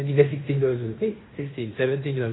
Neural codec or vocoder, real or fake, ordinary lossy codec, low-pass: codec, 16 kHz in and 24 kHz out, 0.9 kbps, LongCat-Audio-Codec, fine tuned four codebook decoder; fake; AAC, 16 kbps; 7.2 kHz